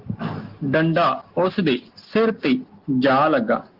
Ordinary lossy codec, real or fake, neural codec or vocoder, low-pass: Opus, 16 kbps; real; none; 5.4 kHz